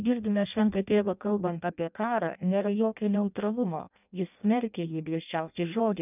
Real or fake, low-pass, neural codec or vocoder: fake; 3.6 kHz; codec, 16 kHz in and 24 kHz out, 0.6 kbps, FireRedTTS-2 codec